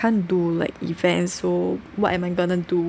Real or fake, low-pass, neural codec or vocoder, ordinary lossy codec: real; none; none; none